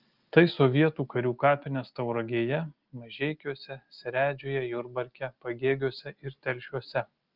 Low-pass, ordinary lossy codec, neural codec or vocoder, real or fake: 5.4 kHz; Opus, 32 kbps; none; real